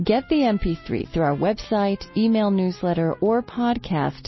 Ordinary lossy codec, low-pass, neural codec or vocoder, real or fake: MP3, 24 kbps; 7.2 kHz; none; real